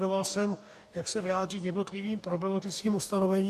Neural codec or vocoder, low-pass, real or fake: codec, 44.1 kHz, 2.6 kbps, DAC; 14.4 kHz; fake